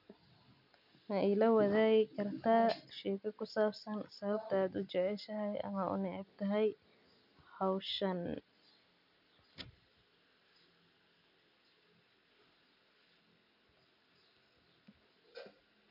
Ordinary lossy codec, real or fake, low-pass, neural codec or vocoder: none; real; 5.4 kHz; none